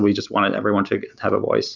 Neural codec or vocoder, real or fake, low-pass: none; real; 7.2 kHz